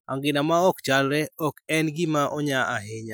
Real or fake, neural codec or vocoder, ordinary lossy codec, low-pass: real; none; none; none